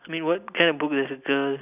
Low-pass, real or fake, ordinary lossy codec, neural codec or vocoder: 3.6 kHz; real; none; none